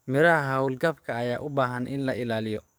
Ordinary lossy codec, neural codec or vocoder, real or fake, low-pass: none; codec, 44.1 kHz, 7.8 kbps, DAC; fake; none